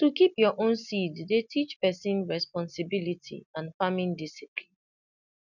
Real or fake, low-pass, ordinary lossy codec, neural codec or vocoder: real; 7.2 kHz; none; none